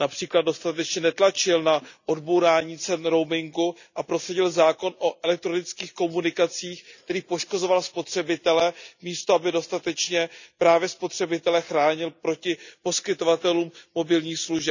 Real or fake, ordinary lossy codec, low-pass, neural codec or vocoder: real; none; 7.2 kHz; none